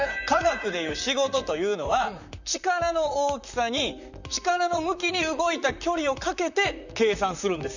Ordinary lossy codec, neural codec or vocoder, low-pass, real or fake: none; vocoder, 44.1 kHz, 128 mel bands, Pupu-Vocoder; 7.2 kHz; fake